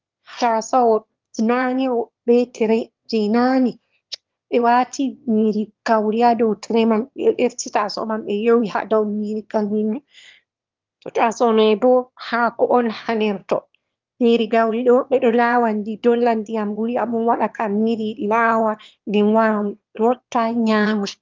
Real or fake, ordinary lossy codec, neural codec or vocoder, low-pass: fake; Opus, 24 kbps; autoencoder, 22.05 kHz, a latent of 192 numbers a frame, VITS, trained on one speaker; 7.2 kHz